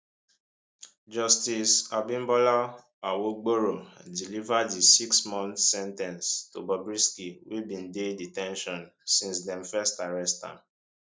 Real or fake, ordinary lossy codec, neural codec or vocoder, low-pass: real; none; none; none